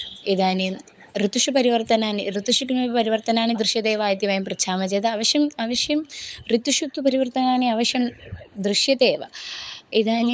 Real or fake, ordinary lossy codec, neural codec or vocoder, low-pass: fake; none; codec, 16 kHz, 16 kbps, FunCodec, trained on LibriTTS, 50 frames a second; none